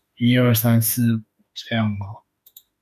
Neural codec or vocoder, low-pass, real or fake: autoencoder, 48 kHz, 32 numbers a frame, DAC-VAE, trained on Japanese speech; 14.4 kHz; fake